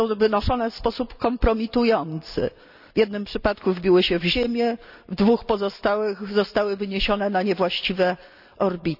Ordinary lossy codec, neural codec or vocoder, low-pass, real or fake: none; none; 5.4 kHz; real